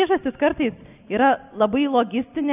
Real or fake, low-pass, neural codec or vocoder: real; 3.6 kHz; none